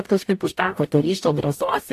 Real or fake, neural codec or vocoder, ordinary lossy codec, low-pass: fake; codec, 44.1 kHz, 0.9 kbps, DAC; AAC, 64 kbps; 14.4 kHz